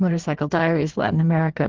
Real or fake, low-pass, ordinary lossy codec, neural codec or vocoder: fake; 7.2 kHz; Opus, 16 kbps; codec, 16 kHz in and 24 kHz out, 1.1 kbps, FireRedTTS-2 codec